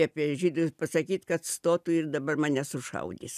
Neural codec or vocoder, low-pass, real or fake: none; 14.4 kHz; real